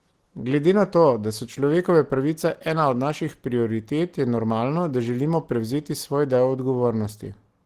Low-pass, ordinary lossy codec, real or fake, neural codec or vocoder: 14.4 kHz; Opus, 16 kbps; real; none